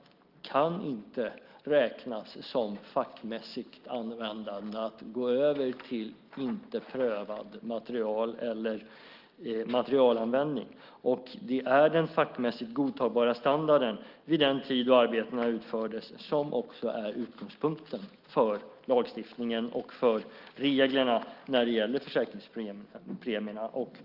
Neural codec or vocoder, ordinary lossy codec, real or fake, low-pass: none; Opus, 32 kbps; real; 5.4 kHz